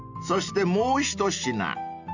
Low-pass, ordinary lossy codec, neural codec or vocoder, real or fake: 7.2 kHz; none; none; real